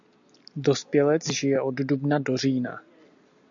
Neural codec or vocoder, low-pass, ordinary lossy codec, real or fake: none; 7.2 kHz; AAC, 64 kbps; real